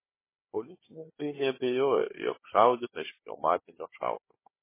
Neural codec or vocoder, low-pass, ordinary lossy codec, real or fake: codec, 16 kHz, 4 kbps, FunCodec, trained on Chinese and English, 50 frames a second; 3.6 kHz; MP3, 16 kbps; fake